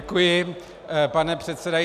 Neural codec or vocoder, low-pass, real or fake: none; 14.4 kHz; real